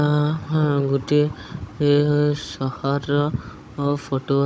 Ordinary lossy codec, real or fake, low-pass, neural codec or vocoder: none; fake; none; codec, 16 kHz, 16 kbps, FunCodec, trained on Chinese and English, 50 frames a second